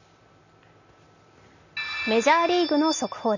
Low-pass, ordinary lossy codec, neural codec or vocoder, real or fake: 7.2 kHz; none; none; real